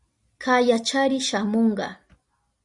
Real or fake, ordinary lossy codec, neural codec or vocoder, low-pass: real; Opus, 64 kbps; none; 10.8 kHz